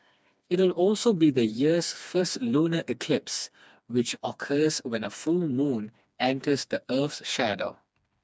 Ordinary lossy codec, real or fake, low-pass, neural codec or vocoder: none; fake; none; codec, 16 kHz, 2 kbps, FreqCodec, smaller model